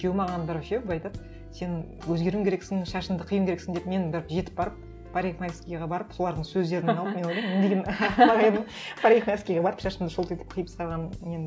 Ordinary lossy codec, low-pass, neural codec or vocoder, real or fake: none; none; none; real